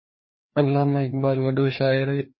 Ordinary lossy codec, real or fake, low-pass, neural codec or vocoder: MP3, 24 kbps; fake; 7.2 kHz; codec, 16 kHz, 2 kbps, FreqCodec, larger model